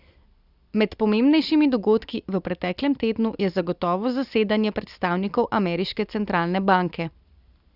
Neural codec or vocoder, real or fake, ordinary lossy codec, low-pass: none; real; Opus, 64 kbps; 5.4 kHz